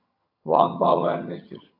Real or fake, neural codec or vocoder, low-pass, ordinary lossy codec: fake; vocoder, 22.05 kHz, 80 mel bands, HiFi-GAN; 5.4 kHz; AAC, 32 kbps